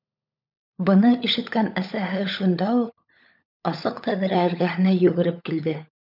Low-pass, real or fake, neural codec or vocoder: 5.4 kHz; fake; codec, 16 kHz, 16 kbps, FunCodec, trained on LibriTTS, 50 frames a second